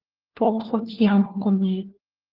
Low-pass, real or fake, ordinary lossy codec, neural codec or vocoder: 5.4 kHz; fake; Opus, 16 kbps; codec, 16 kHz, 1 kbps, FunCodec, trained on LibriTTS, 50 frames a second